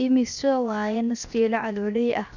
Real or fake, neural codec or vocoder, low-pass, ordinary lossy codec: fake; codec, 16 kHz, 0.7 kbps, FocalCodec; 7.2 kHz; none